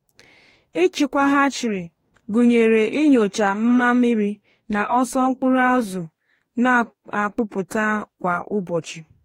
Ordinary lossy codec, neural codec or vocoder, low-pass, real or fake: AAC, 48 kbps; codec, 44.1 kHz, 2.6 kbps, DAC; 19.8 kHz; fake